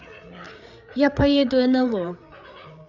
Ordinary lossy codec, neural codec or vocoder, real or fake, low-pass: none; codec, 16 kHz, 8 kbps, FreqCodec, larger model; fake; 7.2 kHz